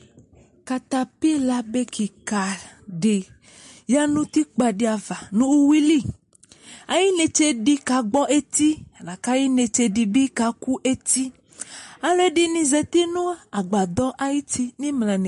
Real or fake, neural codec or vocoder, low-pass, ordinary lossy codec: real; none; 10.8 kHz; MP3, 48 kbps